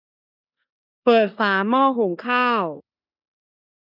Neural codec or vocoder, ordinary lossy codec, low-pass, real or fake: codec, 16 kHz in and 24 kHz out, 0.9 kbps, LongCat-Audio-Codec, four codebook decoder; none; 5.4 kHz; fake